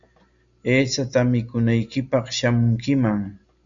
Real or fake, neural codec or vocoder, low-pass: real; none; 7.2 kHz